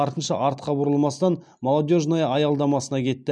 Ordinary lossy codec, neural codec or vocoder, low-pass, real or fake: none; none; 9.9 kHz; real